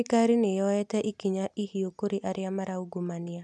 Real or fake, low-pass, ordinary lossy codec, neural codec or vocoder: real; none; none; none